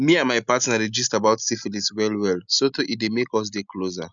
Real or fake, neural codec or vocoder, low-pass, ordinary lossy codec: real; none; 7.2 kHz; none